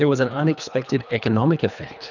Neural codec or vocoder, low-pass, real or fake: codec, 24 kHz, 3 kbps, HILCodec; 7.2 kHz; fake